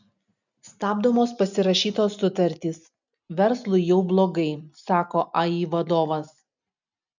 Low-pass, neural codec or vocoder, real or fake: 7.2 kHz; none; real